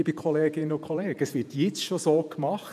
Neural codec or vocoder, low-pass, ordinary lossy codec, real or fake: vocoder, 48 kHz, 128 mel bands, Vocos; 14.4 kHz; none; fake